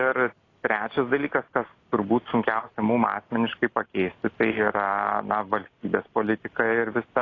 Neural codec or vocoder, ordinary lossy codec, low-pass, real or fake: none; AAC, 32 kbps; 7.2 kHz; real